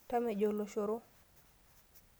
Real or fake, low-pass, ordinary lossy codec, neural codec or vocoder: real; none; none; none